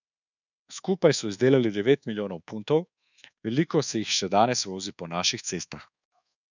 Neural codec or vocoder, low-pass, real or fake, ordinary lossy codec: codec, 24 kHz, 1.2 kbps, DualCodec; 7.2 kHz; fake; none